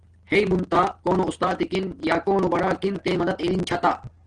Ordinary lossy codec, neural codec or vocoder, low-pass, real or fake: Opus, 16 kbps; none; 9.9 kHz; real